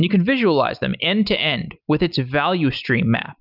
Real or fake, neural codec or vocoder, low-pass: real; none; 5.4 kHz